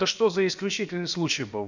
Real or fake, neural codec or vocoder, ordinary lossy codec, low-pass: fake; codec, 16 kHz, about 1 kbps, DyCAST, with the encoder's durations; none; 7.2 kHz